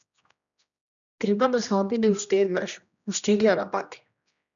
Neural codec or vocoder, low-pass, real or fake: codec, 16 kHz, 1 kbps, X-Codec, HuBERT features, trained on general audio; 7.2 kHz; fake